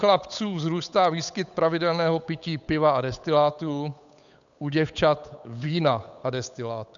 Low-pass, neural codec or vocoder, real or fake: 7.2 kHz; codec, 16 kHz, 8 kbps, FunCodec, trained on Chinese and English, 25 frames a second; fake